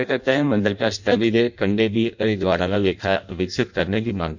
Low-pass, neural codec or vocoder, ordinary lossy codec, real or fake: 7.2 kHz; codec, 16 kHz in and 24 kHz out, 0.6 kbps, FireRedTTS-2 codec; none; fake